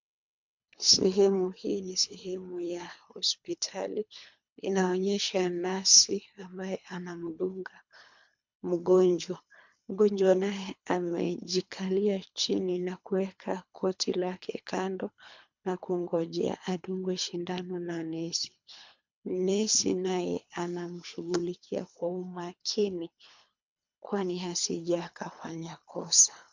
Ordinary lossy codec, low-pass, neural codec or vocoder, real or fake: MP3, 64 kbps; 7.2 kHz; codec, 24 kHz, 3 kbps, HILCodec; fake